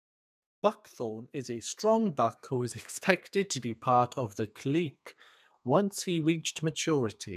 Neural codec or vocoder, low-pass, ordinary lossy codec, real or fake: codec, 32 kHz, 1.9 kbps, SNAC; 14.4 kHz; none; fake